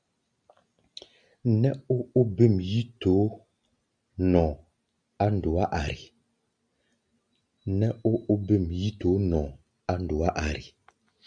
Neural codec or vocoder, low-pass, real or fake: none; 9.9 kHz; real